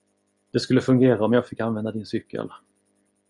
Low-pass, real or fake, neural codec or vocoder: 10.8 kHz; real; none